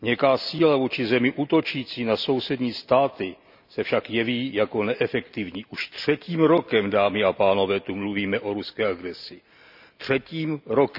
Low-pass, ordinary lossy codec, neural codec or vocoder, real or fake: 5.4 kHz; none; none; real